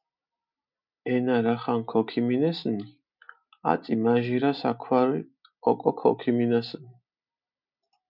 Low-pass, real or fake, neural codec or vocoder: 5.4 kHz; real; none